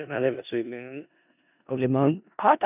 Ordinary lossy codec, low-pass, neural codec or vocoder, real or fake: none; 3.6 kHz; codec, 16 kHz in and 24 kHz out, 0.4 kbps, LongCat-Audio-Codec, four codebook decoder; fake